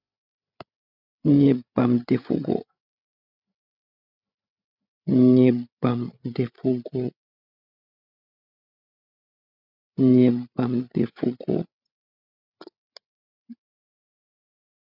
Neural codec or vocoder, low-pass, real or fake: codec, 16 kHz, 8 kbps, FreqCodec, larger model; 5.4 kHz; fake